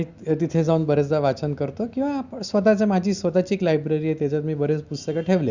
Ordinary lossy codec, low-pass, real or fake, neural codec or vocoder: Opus, 64 kbps; 7.2 kHz; real; none